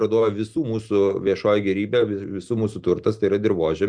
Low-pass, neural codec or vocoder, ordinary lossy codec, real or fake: 9.9 kHz; none; Opus, 64 kbps; real